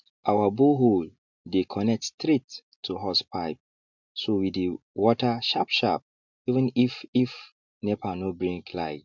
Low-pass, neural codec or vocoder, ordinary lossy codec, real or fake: 7.2 kHz; none; MP3, 64 kbps; real